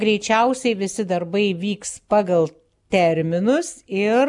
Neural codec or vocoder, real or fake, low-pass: none; real; 10.8 kHz